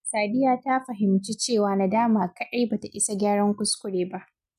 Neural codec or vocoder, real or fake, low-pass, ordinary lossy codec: none; real; 14.4 kHz; none